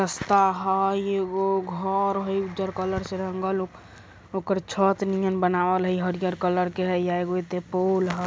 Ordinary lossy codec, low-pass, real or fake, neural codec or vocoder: none; none; real; none